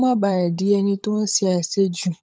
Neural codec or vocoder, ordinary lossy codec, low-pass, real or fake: codec, 16 kHz, 16 kbps, FunCodec, trained on LibriTTS, 50 frames a second; none; none; fake